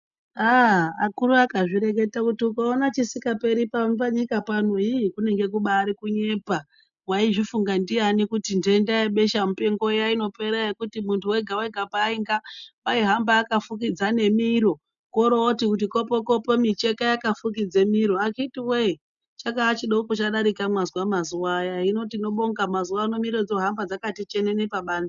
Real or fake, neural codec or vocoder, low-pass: real; none; 7.2 kHz